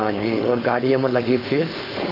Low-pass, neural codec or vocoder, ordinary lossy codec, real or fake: 5.4 kHz; codec, 16 kHz, 4.8 kbps, FACodec; none; fake